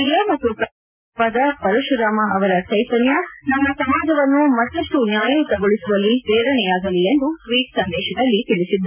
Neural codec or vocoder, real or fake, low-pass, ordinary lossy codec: none; real; 3.6 kHz; none